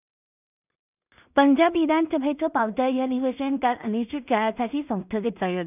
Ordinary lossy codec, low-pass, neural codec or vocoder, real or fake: none; 3.6 kHz; codec, 16 kHz in and 24 kHz out, 0.4 kbps, LongCat-Audio-Codec, two codebook decoder; fake